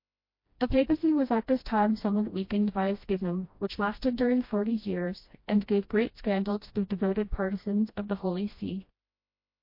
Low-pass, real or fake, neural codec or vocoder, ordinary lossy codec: 5.4 kHz; fake; codec, 16 kHz, 1 kbps, FreqCodec, smaller model; MP3, 32 kbps